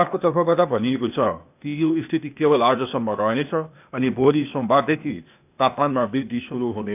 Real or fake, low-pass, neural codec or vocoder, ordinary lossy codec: fake; 3.6 kHz; codec, 16 kHz, 0.8 kbps, ZipCodec; none